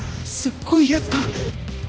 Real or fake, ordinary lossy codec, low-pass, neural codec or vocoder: fake; none; none; codec, 16 kHz, 1 kbps, X-Codec, HuBERT features, trained on balanced general audio